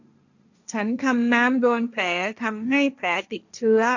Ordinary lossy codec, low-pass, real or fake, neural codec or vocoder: none; 7.2 kHz; fake; codec, 16 kHz, 1.1 kbps, Voila-Tokenizer